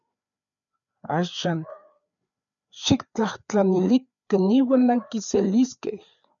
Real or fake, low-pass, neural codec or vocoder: fake; 7.2 kHz; codec, 16 kHz, 4 kbps, FreqCodec, larger model